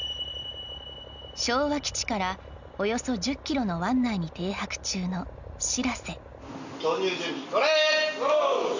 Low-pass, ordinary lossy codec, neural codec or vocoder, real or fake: 7.2 kHz; none; none; real